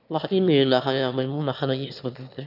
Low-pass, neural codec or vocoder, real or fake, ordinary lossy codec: 5.4 kHz; autoencoder, 22.05 kHz, a latent of 192 numbers a frame, VITS, trained on one speaker; fake; none